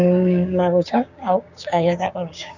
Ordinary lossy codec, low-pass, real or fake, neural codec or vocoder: none; 7.2 kHz; fake; codec, 16 kHz, 6 kbps, DAC